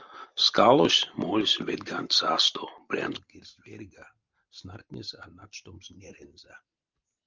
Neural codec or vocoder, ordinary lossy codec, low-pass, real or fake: vocoder, 44.1 kHz, 128 mel bands every 512 samples, BigVGAN v2; Opus, 32 kbps; 7.2 kHz; fake